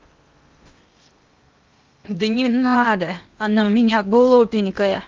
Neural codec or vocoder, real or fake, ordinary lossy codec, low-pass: codec, 16 kHz in and 24 kHz out, 0.8 kbps, FocalCodec, streaming, 65536 codes; fake; Opus, 24 kbps; 7.2 kHz